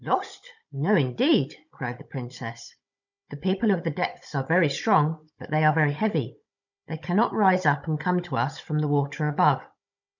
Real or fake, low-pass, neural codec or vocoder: fake; 7.2 kHz; codec, 16 kHz, 16 kbps, FunCodec, trained on Chinese and English, 50 frames a second